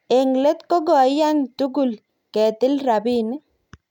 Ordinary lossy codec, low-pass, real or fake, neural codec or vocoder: none; 19.8 kHz; real; none